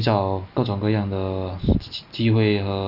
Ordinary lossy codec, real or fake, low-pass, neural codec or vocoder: none; real; 5.4 kHz; none